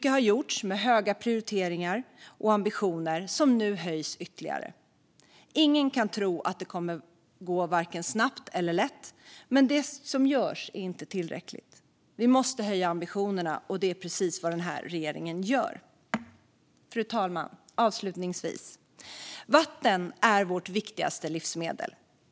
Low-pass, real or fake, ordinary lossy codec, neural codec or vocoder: none; real; none; none